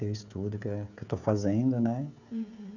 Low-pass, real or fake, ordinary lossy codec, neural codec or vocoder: 7.2 kHz; fake; none; codec, 16 kHz, 16 kbps, FreqCodec, smaller model